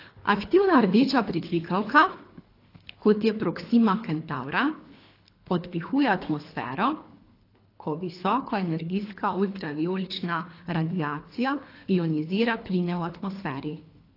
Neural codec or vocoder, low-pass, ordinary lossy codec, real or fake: codec, 24 kHz, 3 kbps, HILCodec; 5.4 kHz; AAC, 32 kbps; fake